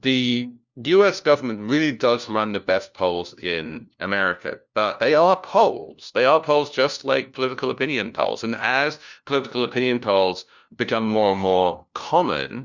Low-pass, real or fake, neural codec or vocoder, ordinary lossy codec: 7.2 kHz; fake; codec, 16 kHz, 1 kbps, FunCodec, trained on LibriTTS, 50 frames a second; Opus, 64 kbps